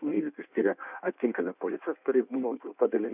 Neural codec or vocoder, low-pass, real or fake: codec, 16 kHz in and 24 kHz out, 1.1 kbps, FireRedTTS-2 codec; 3.6 kHz; fake